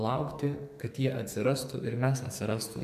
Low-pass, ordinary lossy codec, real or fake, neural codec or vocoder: 14.4 kHz; MP3, 96 kbps; fake; codec, 44.1 kHz, 2.6 kbps, SNAC